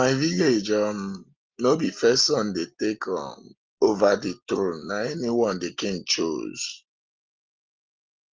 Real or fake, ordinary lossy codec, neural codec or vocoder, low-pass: real; Opus, 24 kbps; none; 7.2 kHz